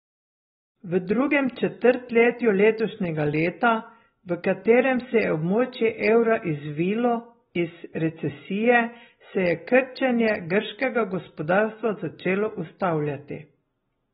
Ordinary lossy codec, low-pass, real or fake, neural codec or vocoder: AAC, 16 kbps; 9.9 kHz; real; none